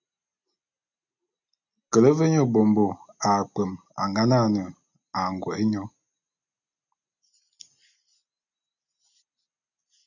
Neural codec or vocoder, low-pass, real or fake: none; 7.2 kHz; real